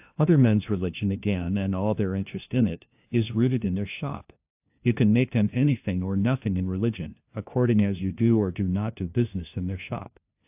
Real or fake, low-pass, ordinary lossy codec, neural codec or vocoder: fake; 3.6 kHz; AAC, 32 kbps; codec, 16 kHz, 1 kbps, FunCodec, trained on LibriTTS, 50 frames a second